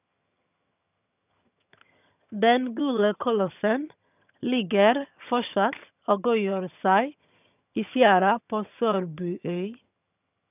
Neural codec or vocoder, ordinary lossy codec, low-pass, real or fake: vocoder, 22.05 kHz, 80 mel bands, HiFi-GAN; none; 3.6 kHz; fake